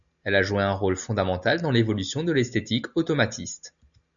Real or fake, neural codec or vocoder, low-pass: real; none; 7.2 kHz